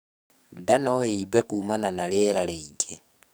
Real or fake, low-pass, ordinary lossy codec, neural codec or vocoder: fake; none; none; codec, 44.1 kHz, 2.6 kbps, SNAC